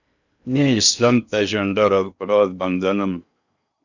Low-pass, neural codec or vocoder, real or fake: 7.2 kHz; codec, 16 kHz in and 24 kHz out, 0.8 kbps, FocalCodec, streaming, 65536 codes; fake